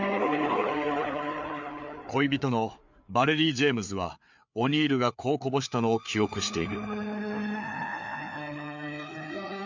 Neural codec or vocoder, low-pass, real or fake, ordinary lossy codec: codec, 16 kHz, 4 kbps, FreqCodec, larger model; 7.2 kHz; fake; MP3, 64 kbps